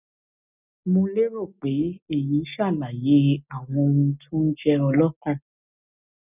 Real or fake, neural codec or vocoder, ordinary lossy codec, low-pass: real; none; none; 3.6 kHz